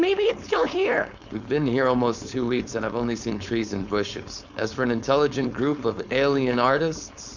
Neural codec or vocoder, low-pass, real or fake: codec, 16 kHz, 4.8 kbps, FACodec; 7.2 kHz; fake